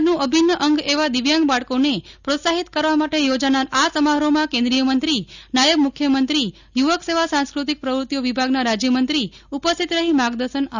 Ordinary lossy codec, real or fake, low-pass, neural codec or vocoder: none; real; 7.2 kHz; none